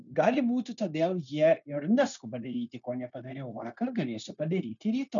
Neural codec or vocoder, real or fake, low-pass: codec, 16 kHz, 0.9 kbps, LongCat-Audio-Codec; fake; 7.2 kHz